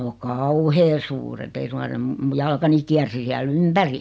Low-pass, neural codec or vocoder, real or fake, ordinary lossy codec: none; none; real; none